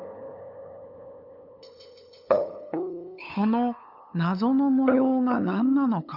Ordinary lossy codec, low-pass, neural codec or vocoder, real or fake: none; 5.4 kHz; codec, 16 kHz, 8 kbps, FunCodec, trained on LibriTTS, 25 frames a second; fake